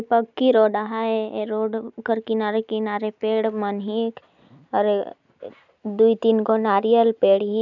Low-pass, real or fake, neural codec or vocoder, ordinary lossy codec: 7.2 kHz; real; none; none